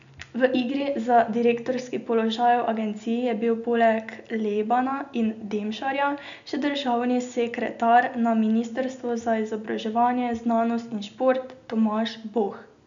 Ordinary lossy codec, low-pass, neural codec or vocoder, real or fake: none; 7.2 kHz; none; real